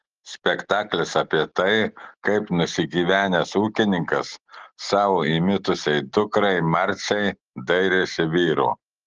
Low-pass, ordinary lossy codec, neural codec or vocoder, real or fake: 7.2 kHz; Opus, 16 kbps; none; real